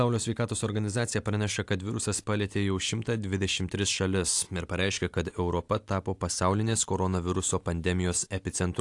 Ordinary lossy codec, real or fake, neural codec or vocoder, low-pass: AAC, 64 kbps; real; none; 10.8 kHz